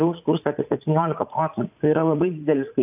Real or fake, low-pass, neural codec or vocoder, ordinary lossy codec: fake; 3.6 kHz; vocoder, 44.1 kHz, 80 mel bands, Vocos; AAC, 32 kbps